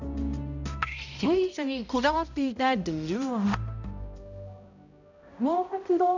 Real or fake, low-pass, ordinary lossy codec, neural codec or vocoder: fake; 7.2 kHz; none; codec, 16 kHz, 0.5 kbps, X-Codec, HuBERT features, trained on balanced general audio